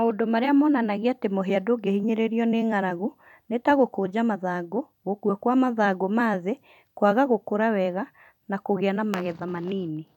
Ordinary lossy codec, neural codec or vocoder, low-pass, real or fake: none; vocoder, 44.1 kHz, 128 mel bands every 256 samples, BigVGAN v2; 19.8 kHz; fake